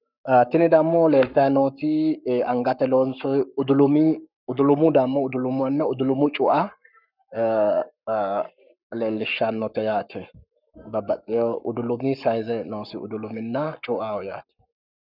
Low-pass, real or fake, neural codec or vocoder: 5.4 kHz; fake; codec, 44.1 kHz, 7.8 kbps, Pupu-Codec